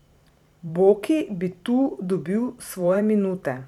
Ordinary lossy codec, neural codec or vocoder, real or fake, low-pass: none; vocoder, 44.1 kHz, 128 mel bands every 512 samples, BigVGAN v2; fake; 19.8 kHz